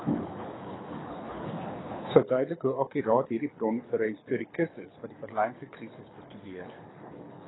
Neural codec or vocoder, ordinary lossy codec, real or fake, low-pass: codec, 24 kHz, 6 kbps, HILCodec; AAC, 16 kbps; fake; 7.2 kHz